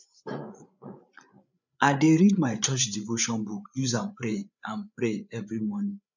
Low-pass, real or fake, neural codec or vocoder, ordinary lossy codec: 7.2 kHz; fake; codec, 16 kHz, 8 kbps, FreqCodec, larger model; none